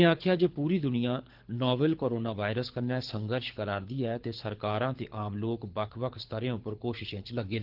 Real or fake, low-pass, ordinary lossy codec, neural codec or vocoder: fake; 5.4 kHz; Opus, 16 kbps; codec, 24 kHz, 6 kbps, HILCodec